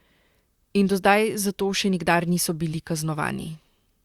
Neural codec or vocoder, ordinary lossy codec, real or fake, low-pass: vocoder, 44.1 kHz, 128 mel bands, Pupu-Vocoder; Opus, 64 kbps; fake; 19.8 kHz